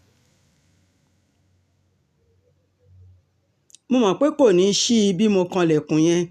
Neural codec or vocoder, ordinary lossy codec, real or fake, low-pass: none; none; real; 14.4 kHz